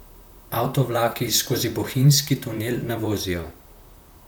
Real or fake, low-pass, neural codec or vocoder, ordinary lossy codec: fake; none; vocoder, 44.1 kHz, 128 mel bands, Pupu-Vocoder; none